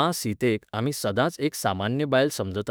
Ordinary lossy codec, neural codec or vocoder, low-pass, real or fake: none; autoencoder, 48 kHz, 32 numbers a frame, DAC-VAE, trained on Japanese speech; none; fake